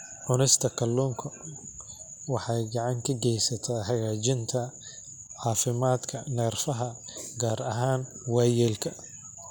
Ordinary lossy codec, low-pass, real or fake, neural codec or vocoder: none; none; real; none